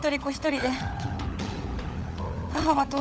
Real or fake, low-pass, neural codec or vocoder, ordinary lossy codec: fake; none; codec, 16 kHz, 16 kbps, FunCodec, trained on LibriTTS, 50 frames a second; none